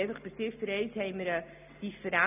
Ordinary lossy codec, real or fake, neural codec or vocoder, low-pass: none; real; none; 3.6 kHz